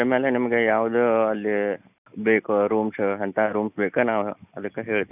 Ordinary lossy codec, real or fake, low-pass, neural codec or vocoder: none; real; 3.6 kHz; none